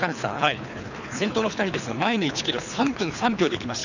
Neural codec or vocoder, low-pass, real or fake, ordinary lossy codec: codec, 24 kHz, 3 kbps, HILCodec; 7.2 kHz; fake; none